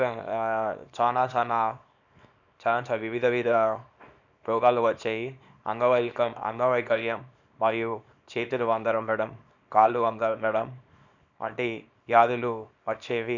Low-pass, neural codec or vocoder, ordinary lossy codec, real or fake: 7.2 kHz; codec, 24 kHz, 0.9 kbps, WavTokenizer, small release; none; fake